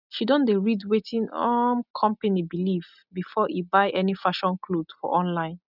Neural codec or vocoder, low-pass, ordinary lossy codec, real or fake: none; 5.4 kHz; none; real